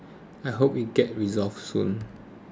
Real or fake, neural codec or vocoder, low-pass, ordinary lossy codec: real; none; none; none